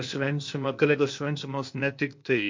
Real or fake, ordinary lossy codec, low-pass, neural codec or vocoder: fake; MP3, 48 kbps; 7.2 kHz; codec, 16 kHz, 0.8 kbps, ZipCodec